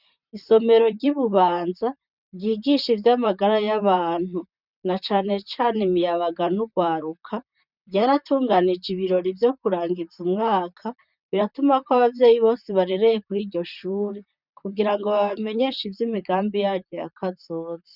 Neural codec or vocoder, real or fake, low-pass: vocoder, 44.1 kHz, 128 mel bands, Pupu-Vocoder; fake; 5.4 kHz